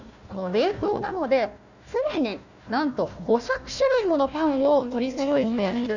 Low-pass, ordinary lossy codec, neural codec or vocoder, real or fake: 7.2 kHz; none; codec, 16 kHz, 1 kbps, FunCodec, trained on Chinese and English, 50 frames a second; fake